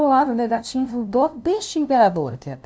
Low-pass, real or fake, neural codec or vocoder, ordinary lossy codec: none; fake; codec, 16 kHz, 0.5 kbps, FunCodec, trained on LibriTTS, 25 frames a second; none